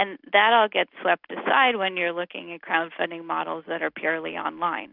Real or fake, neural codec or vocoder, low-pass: real; none; 5.4 kHz